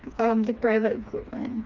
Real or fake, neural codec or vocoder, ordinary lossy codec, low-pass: fake; codec, 16 kHz, 2 kbps, FreqCodec, smaller model; none; 7.2 kHz